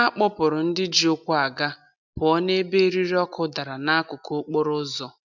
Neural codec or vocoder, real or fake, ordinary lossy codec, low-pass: none; real; none; 7.2 kHz